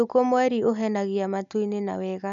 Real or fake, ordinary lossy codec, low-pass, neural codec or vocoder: real; none; 7.2 kHz; none